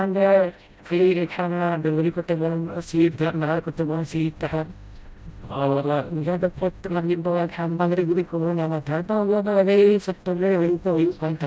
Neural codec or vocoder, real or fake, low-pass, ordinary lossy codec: codec, 16 kHz, 0.5 kbps, FreqCodec, smaller model; fake; none; none